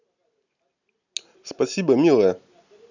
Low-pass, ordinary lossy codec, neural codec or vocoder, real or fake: 7.2 kHz; none; none; real